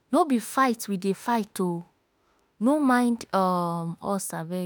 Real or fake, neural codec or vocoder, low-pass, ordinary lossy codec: fake; autoencoder, 48 kHz, 32 numbers a frame, DAC-VAE, trained on Japanese speech; none; none